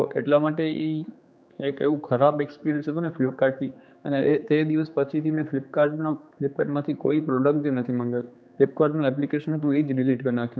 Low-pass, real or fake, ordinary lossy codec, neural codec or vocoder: none; fake; none; codec, 16 kHz, 4 kbps, X-Codec, HuBERT features, trained on general audio